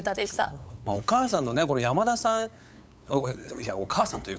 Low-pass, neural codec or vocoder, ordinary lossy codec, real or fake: none; codec, 16 kHz, 8 kbps, FunCodec, trained on LibriTTS, 25 frames a second; none; fake